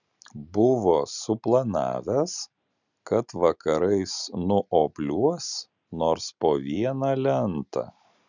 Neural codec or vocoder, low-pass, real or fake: none; 7.2 kHz; real